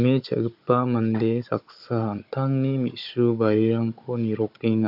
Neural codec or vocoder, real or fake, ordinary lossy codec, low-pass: codec, 16 kHz, 16 kbps, FunCodec, trained on Chinese and English, 50 frames a second; fake; AAC, 48 kbps; 5.4 kHz